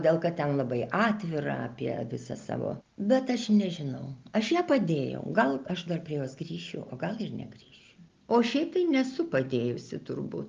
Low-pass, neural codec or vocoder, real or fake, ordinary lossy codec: 7.2 kHz; none; real; Opus, 24 kbps